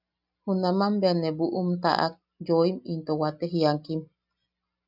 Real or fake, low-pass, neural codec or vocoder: real; 5.4 kHz; none